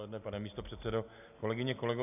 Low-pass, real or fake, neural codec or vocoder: 3.6 kHz; real; none